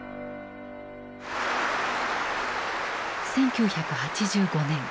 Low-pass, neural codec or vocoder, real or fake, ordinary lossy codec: none; none; real; none